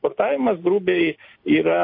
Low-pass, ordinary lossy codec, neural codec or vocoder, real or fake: 5.4 kHz; MP3, 32 kbps; none; real